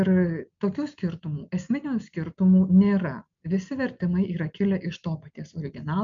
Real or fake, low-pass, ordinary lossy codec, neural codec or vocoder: real; 7.2 kHz; MP3, 64 kbps; none